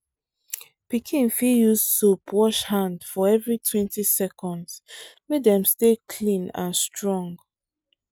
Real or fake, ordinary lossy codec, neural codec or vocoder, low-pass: real; none; none; none